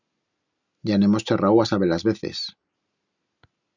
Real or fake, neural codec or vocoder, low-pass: real; none; 7.2 kHz